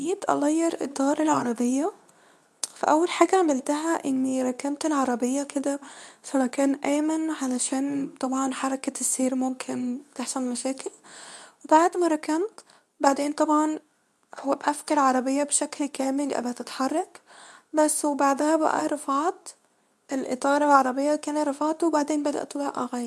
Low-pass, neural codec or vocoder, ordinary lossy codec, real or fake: none; codec, 24 kHz, 0.9 kbps, WavTokenizer, medium speech release version 2; none; fake